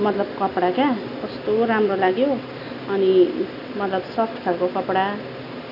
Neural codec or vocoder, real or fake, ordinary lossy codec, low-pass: none; real; none; 5.4 kHz